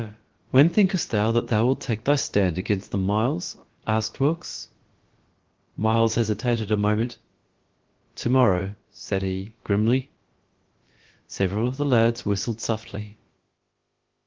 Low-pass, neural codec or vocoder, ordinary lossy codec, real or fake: 7.2 kHz; codec, 16 kHz, about 1 kbps, DyCAST, with the encoder's durations; Opus, 16 kbps; fake